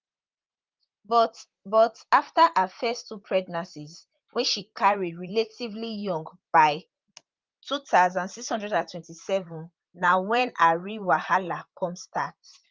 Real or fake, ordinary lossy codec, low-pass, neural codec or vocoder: fake; Opus, 32 kbps; 7.2 kHz; vocoder, 44.1 kHz, 128 mel bands, Pupu-Vocoder